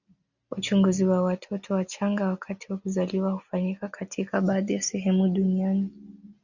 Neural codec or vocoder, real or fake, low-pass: none; real; 7.2 kHz